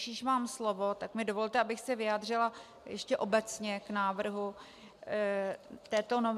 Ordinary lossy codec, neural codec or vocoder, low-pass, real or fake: MP3, 96 kbps; none; 14.4 kHz; real